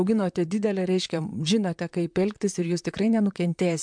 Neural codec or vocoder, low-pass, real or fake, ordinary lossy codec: vocoder, 44.1 kHz, 128 mel bands, Pupu-Vocoder; 9.9 kHz; fake; MP3, 64 kbps